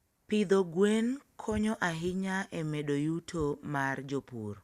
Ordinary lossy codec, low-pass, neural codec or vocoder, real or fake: Opus, 64 kbps; 14.4 kHz; none; real